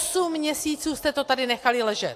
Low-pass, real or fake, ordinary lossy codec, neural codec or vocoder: 14.4 kHz; real; AAC, 64 kbps; none